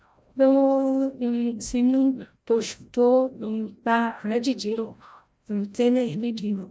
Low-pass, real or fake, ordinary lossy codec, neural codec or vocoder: none; fake; none; codec, 16 kHz, 0.5 kbps, FreqCodec, larger model